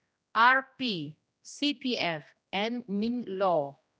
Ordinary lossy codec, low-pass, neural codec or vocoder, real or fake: none; none; codec, 16 kHz, 1 kbps, X-Codec, HuBERT features, trained on general audio; fake